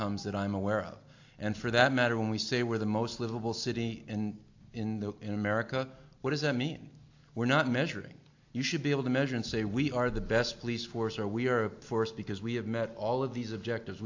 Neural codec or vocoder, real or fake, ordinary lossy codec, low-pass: none; real; AAC, 48 kbps; 7.2 kHz